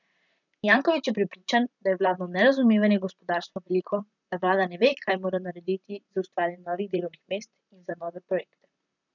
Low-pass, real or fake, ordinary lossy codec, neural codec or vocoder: 7.2 kHz; fake; none; vocoder, 44.1 kHz, 128 mel bands, Pupu-Vocoder